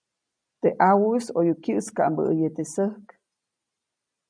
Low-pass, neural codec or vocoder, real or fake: 9.9 kHz; none; real